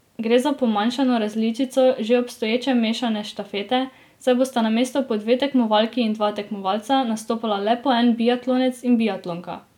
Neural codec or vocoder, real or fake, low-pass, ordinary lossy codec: none; real; 19.8 kHz; none